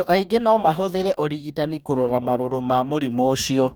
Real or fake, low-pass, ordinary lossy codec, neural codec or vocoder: fake; none; none; codec, 44.1 kHz, 2.6 kbps, DAC